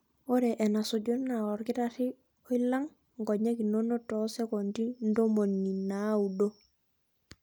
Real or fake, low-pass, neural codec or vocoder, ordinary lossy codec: real; none; none; none